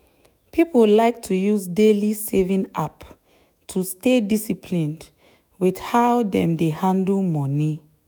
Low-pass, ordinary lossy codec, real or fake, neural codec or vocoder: none; none; fake; autoencoder, 48 kHz, 128 numbers a frame, DAC-VAE, trained on Japanese speech